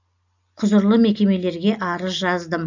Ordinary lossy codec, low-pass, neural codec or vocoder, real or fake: none; 7.2 kHz; none; real